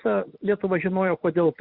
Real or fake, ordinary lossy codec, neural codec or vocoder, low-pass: fake; Opus, 64 kbps; vocoder, 44.1 kHz, 128 mel bands every 256 samples, BigVGAN v2; 5.4 kHz